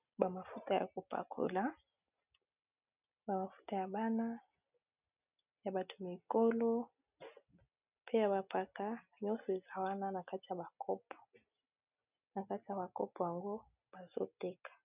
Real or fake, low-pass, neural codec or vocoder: real; 3.6 kHz; none